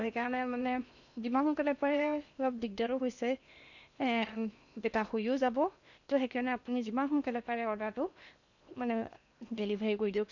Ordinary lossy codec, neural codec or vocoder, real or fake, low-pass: none; codec, 16 kHz in and 24 kHz out, 0.8 kbps, FocalCodec, streaming, 65536 codes; fake; 7.2 kHz